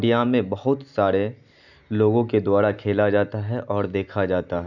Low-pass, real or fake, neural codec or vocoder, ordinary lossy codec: 7.2 kHz; real; none; none